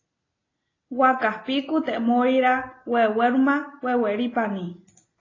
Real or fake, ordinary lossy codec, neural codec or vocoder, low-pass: real; AAC, 32 kbps; none; 7.2 kHz